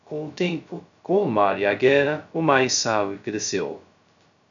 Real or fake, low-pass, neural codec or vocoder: fake; 7.2 kHz; codec, 16 kHz, 0.2 kbps, FocalCodec